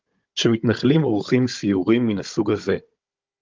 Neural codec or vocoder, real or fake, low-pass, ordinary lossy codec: codec, 16 kHz, 16 kbps, FunCodec, trained on Chinese and English, 50 frames a second; fake; 7.2 kHz; Opus, 24 kbps